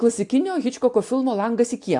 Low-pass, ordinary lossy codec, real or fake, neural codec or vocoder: 10.8 kHz; AAC, 64 kbps; real; none